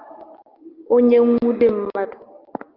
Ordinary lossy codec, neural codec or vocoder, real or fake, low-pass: Opus, 32 kbps; none; real; 5.4 kHz